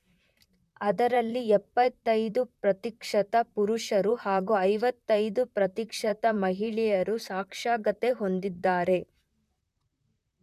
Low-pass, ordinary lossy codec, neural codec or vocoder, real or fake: 14.4 kHz; MP3, 96 kbps; vocoder, 44.1 kHz, 128 mel bands, Pupu-Vocoder; fake